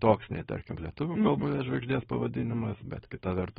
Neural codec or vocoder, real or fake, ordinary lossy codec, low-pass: codec, 16 kHz, 4.8 kbps, FACodec; fake; AAC, 16 kbps; 7.2 kHz